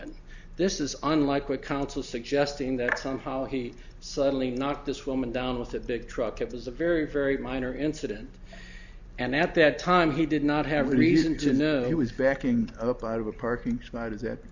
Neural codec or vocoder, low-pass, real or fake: none; 7.2 kHz; real